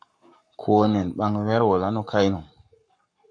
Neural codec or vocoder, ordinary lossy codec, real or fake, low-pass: codec, 44.1 kHz, 7.8 kbps, Pupu-Codec; MP3, 64 kbps; fake; 9.9 kHz